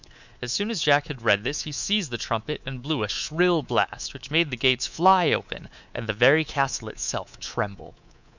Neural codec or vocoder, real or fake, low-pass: codec, 24 kHz, 3.1 kbps, DualCodec; fake; 7.2 kHz